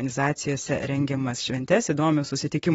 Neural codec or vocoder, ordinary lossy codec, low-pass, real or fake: vocoder, 44.1 kHz, 128 mel bands, Pupu-Vocoder; AAC, 24 kbps; 19.8 kHz; fake